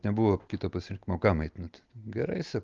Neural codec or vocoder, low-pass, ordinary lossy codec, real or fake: none; 7.2 kHz; Opus, 32 kbps; real